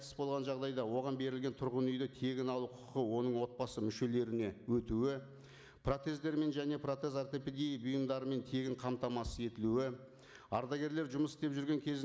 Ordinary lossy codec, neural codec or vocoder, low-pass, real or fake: none; none; none; real